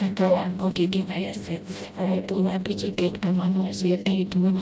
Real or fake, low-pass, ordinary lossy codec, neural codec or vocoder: fake; none; none; codec, 16 kHz, 0.5 kbps, FreqCodec, smaller model